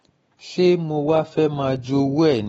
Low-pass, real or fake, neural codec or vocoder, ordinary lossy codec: 19.8 kHz; fake; autoencoder, 48 kHz, 128 numbers a frame, DAC-VAE, trained on Japanese speech; AAC, 24 kbps